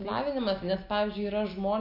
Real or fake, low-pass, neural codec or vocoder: real; 5.4 kHz; none